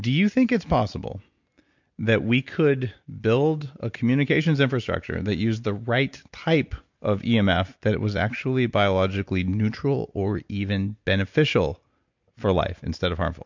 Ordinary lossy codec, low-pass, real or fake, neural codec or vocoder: MP3, 64 kbps; 7.2 kHz; real; none